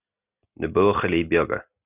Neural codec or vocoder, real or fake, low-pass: none; real; 3.6 kHz